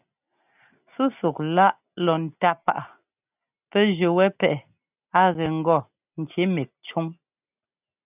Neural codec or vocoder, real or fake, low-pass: none; real; 3.6 kHz